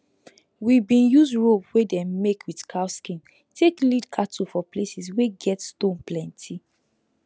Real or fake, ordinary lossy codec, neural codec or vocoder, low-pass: real; none; none; none